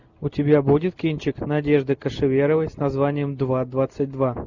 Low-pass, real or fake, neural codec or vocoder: 7.2 kHz; real; none